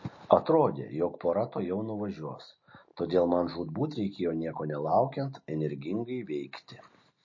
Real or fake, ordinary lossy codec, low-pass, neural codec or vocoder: real; MP3, 32 kbps; 7.2 kHz; none